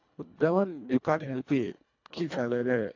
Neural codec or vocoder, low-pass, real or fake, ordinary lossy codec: codec, 24 kHz, 1.5 kbps, HILCodec; 7.2 kHz; fake; AAC, 48 kbps